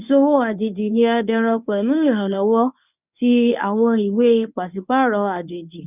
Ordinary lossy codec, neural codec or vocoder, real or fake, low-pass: none; codec, 24 kHz, 0.9 kbps, WavTokenizer, medium speech release version 1; fake; 3.6 kHz